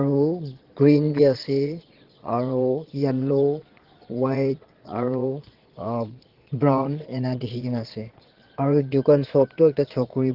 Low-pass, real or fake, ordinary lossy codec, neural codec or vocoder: 5.4 kHz; fake; Opus, 16 kbps; vocoder, 22.05 kHz, 80 mel bands, Vocos